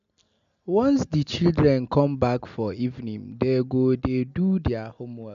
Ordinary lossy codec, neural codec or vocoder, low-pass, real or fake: AAC, 96 kbps; none; 7.2 kHz; real